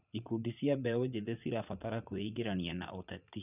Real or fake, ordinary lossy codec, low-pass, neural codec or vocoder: fake; none; 3.6 kHz; vocoder, 44.1 kHz, 80 mel bands, Vocos